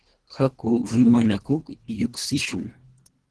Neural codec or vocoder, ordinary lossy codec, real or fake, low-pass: codec, 24 kHz, 1.5 kbps, HILCodec; Opus, 16 kbps; fake; 10.8 kHz